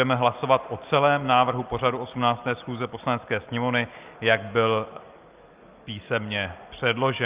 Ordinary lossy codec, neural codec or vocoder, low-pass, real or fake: Opus, 32 kbps; none; 3.6 kHz; real